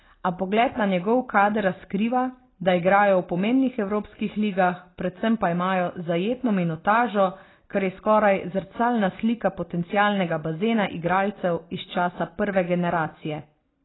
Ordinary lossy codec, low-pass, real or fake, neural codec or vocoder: AAC, 16 kbps; 7.2 kHz; real; none